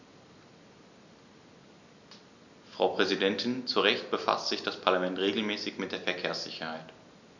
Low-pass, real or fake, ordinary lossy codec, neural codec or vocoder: 7.2 kHz; real; none; none